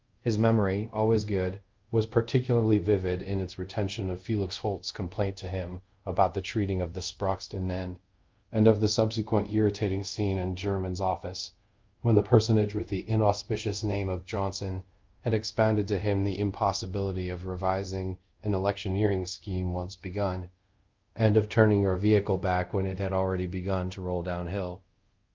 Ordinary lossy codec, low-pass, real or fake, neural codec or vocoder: Opus, 32 kbps; 7.2 kHz; fake; codec, 24 kHz, 0.5 kbps, DualCodec